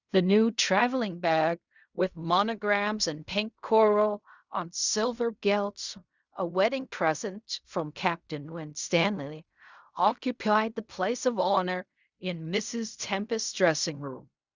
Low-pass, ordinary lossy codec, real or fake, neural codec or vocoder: 7.2 kHz; Opus, 64 kbps; fake; codec, 16 kHz in and 24 kHz out, 0.4 kbps, LongCat-Audio-Codec, fine tuned four codebook decoder